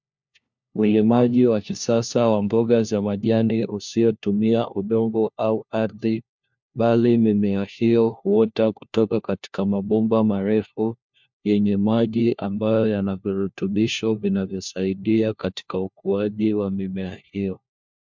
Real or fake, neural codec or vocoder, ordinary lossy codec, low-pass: fake; codec, 16 kHz, 1 kbps, FunCodec, trained on LibriTTS, 50 frames a second; MP3, 64 kbps; 7.2 kHz